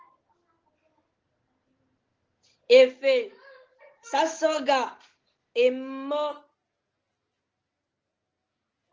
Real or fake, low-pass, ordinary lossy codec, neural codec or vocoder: real; 7.2 kHz; Opus, 16 kbps; none